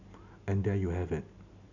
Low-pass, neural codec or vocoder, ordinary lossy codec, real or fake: 7.2 kHz; none; none; real